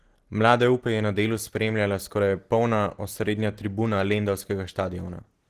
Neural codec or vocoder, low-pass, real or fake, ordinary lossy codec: none; 14.4 kHz; real; Opus, 16 kbps